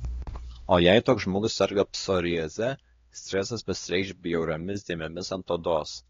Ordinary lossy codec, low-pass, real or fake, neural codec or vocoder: AAC, 32 kbps; 7.2 kHz; fake; codec, 16 kHz, 2 kbps, X-Codec, HuBERT features, trained on LibriSpeech